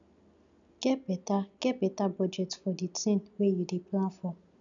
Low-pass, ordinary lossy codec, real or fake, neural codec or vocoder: 7.2 kHz; none; real; none